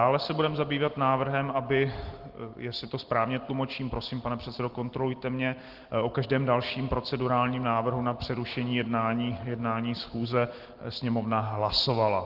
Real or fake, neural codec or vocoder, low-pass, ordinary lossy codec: real; none; 5.4 kHz; Opus, 16 kbps